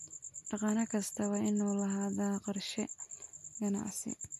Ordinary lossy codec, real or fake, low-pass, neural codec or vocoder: MP3, 64 kbps; real; 14.4 kHz; none